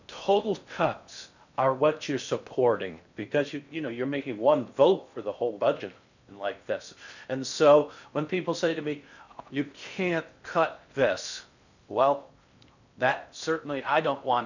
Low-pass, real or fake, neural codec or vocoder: 7.2 kHz; fake; codec, 16 kHz in and 24 kHz out, 0.6 kbps, FocalCodec, streaming, 2048 codes